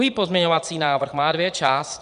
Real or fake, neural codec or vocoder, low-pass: fake; vocoder, 22.05 kHz, 80 mel bands, Vocos; 9.9 kHz